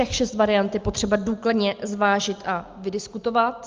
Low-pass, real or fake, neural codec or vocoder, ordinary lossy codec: 7.2 kHz; real; none; Opus, 24 kbps